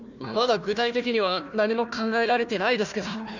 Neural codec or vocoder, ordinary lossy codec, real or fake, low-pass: codec, 16 kHz, 1 kbps, FunCodec, trained on Chinese and English, 50 frames a second; none; fake; 7.2 kHz